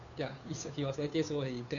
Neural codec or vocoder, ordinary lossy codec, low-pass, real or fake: codec, 16 kHz, 2 kbps, FunCodec, trained on Chinese and English, 25 frames a second; none; 7.2 kHz; fake